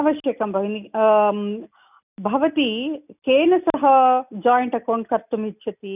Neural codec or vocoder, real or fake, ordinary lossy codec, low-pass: none; real; none; 3.6 kHz